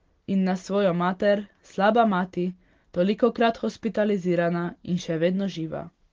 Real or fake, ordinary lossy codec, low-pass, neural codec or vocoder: real; Opus, 16 kbps; 7.2 kHz; none